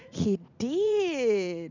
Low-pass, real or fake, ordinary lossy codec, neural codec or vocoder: 7.2 kHz; fake; none; vocoder, 44.1 kHz, 80 mel bands, Vocos